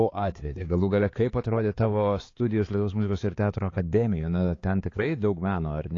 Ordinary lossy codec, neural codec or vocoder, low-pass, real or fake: AAC, 32 kbps; codec, 16 kHz, 4 kbps, X-Codec, HuBERT features, trained on balanced general audio; 7.2 kHz; fake